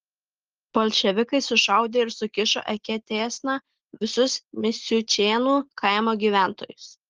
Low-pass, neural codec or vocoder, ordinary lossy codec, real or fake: 7.2 kHz; none; Opus, 16 kbps; real